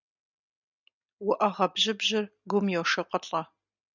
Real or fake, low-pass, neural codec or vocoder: real; 7.2 kHz; none